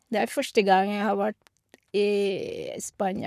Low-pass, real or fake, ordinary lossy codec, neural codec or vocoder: 14.4 kHz; fake; none; codec, 44.1 kHz, 7.8 kbps, Pupu-Codec